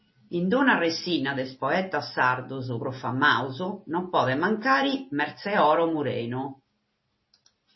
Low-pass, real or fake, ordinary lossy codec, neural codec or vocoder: 7.2 kHz; real; MP3, 24 kbps; none